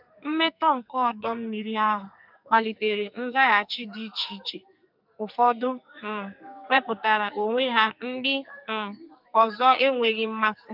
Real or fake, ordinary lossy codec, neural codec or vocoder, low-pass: fake; none; codec, 44.1 kHz, 2.6 kbps, SNAC; 5.4 kHz